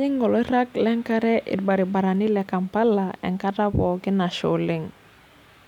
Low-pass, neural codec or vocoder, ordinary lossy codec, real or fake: 19.8 kHz; none; none; real